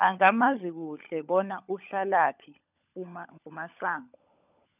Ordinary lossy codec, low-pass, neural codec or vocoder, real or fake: none; 3.6 kHz; codec, 16 kHz, 16 kbps, FunCodec, trained on LibriTTS, 50 frames a second; fake